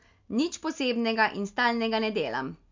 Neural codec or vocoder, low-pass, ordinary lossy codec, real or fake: none; 7.2 kHz; MP3, 64 kbps; real